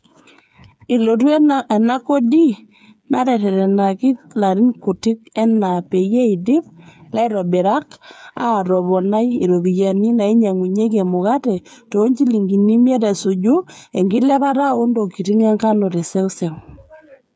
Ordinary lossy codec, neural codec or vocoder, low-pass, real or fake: none; codec, 16 kHz, 8 kbps, FreqCodec, smaller model; none; fake